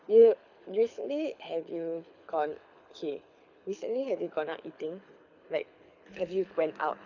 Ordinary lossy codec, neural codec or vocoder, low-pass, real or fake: none; codec, 24 kHz, 6 kbps, HILCodec; 7.2 kHz; fake